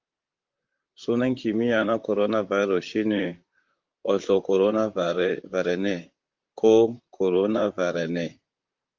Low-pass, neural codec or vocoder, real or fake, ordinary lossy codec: 7.2 kHz; vocoder, 44.1 kHz, 128 mel bands, Pupu-Vocoder; fake; Opus, 24 kbps